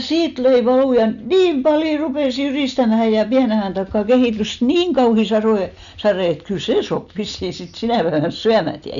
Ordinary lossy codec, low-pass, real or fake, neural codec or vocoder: none; 7.2 kHz; real; none